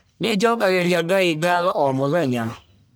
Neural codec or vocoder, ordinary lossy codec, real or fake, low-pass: codec, 44.1 kHz, 1.7 kbps, Pupu-Codec; none; fake; none